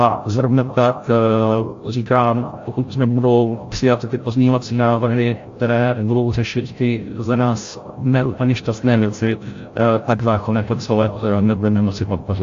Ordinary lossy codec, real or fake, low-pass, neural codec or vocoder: AAC, 48 kbps; fake; 7.2 kHz; codec, 16 kHz, 0.5 kbps, FreqCodec, larger model